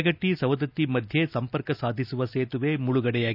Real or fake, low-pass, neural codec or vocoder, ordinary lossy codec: real; 5.4 kHz; none; none